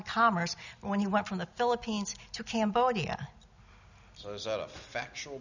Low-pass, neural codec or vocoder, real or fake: 7.2 kHz; none; real